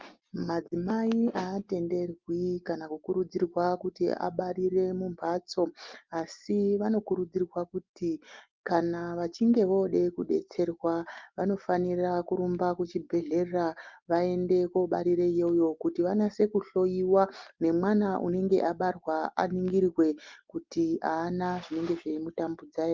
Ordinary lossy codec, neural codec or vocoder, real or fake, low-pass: Opus, 32 kbps; none; real; 7.2 kHz